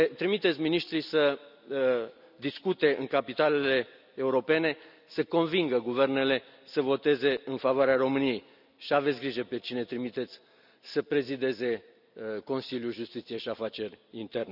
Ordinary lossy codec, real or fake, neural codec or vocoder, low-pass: none; real; none; 5.4 kHz